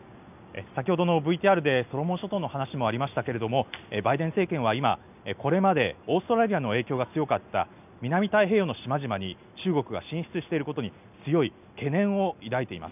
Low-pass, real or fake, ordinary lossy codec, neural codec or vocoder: 3.6 kHz; real; none; none